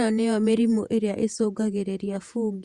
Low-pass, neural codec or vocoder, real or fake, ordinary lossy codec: 14.4 kHz; vocoder, 48 kHz, 128 mel bands, Vocos; fake; Opus, 64 kbps